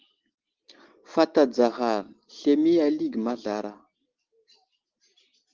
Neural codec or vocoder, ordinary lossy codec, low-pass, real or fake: none; Opus, 16 kbps; 7.2 kHz; real